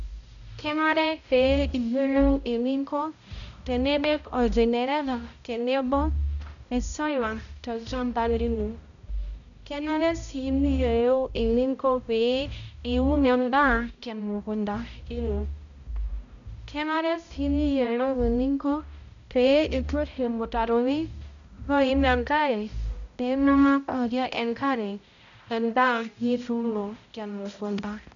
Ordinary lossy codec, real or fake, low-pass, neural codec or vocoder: none; fake; 7.2 kHz; codec, 16 kHz, 0.5 kbps, X-Codec, HuBERT features, trained on balanced general audio